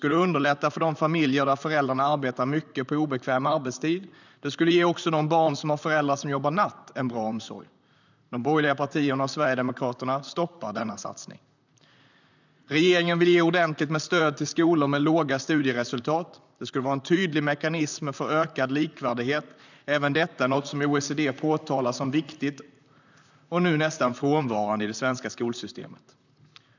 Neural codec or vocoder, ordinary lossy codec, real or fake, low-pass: vocoder, 44.1 kHz, 128 mel bands, Pupu-Vocoder; none; fake; 7.2 kHz